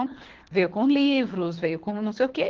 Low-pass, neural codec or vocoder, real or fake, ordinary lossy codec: 7.2 kHz; codec, 24 kHz, 3 kbps, HILCodec; fake; Opus, 16 kbps